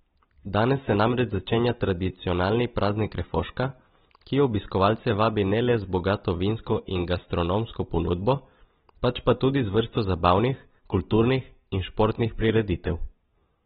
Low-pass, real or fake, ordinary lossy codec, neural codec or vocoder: 14.4 kHz; real; AAC, 16 kbps; none